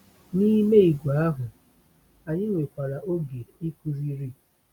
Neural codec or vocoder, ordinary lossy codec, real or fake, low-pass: none; none; real; 19.8 kHz